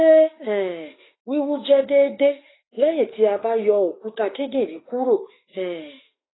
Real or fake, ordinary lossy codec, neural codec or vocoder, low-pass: fake; AAC, 16 kbps; autoencoder, 48 kHz, 32 numbers a frame, DAC-VAE, trained on Japanese speech; 7.2 kHz